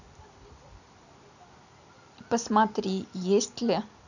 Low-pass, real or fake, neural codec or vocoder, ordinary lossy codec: 7.2 kHz; real; none; none